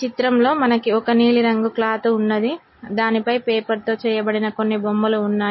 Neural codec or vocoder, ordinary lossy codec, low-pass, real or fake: none; MP3, 24 kbps; 7.2 kHz; real